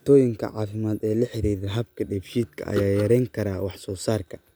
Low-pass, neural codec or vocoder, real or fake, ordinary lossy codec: none; none; real; none